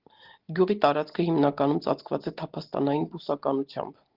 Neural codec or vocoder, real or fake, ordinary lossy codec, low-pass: none; real; Opus, 16 kbps; 5.4 kHz